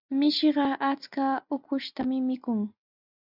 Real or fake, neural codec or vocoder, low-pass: real; none; 5.4 kHz